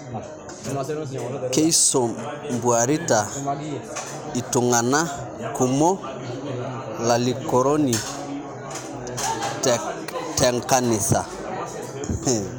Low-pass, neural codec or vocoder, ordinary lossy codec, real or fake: none; none; none; real